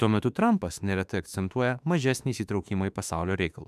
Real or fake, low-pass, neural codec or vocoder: fake; 14.4 kHz; autoencoder, 48 kHz, 32 numbers a frame, DAC-VAE, trained on Japanese speech